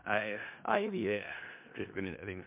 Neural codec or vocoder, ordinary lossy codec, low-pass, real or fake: codec, 16 kHz in and 24 kHz out, 0.4 kbps, LongCat-Audio-Codec, four codebook decoder; MP3, 32 kbps; 3.6 kHz; fake